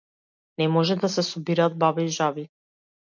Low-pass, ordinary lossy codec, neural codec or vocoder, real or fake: 7.2 kHz; MP3, 64 kbps; none; real